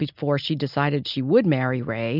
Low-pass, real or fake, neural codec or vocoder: 5.4 kHz; real; none